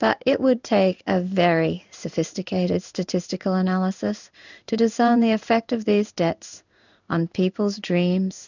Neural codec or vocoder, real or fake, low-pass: codec, 16 kHz in and 24 kHz out, 1 kbps, XY-Tokenizer; fake; 7.2 kHz